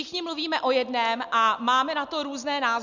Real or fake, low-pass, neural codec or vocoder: real; 7.2 kHz; none